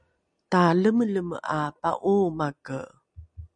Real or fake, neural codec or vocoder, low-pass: real; none; 9.9 kHz